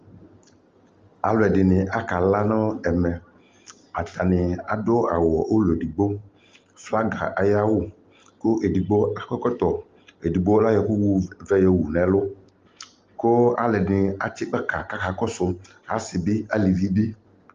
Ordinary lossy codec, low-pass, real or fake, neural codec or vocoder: Opus, 32 kbps; 7.2 kHz; real; none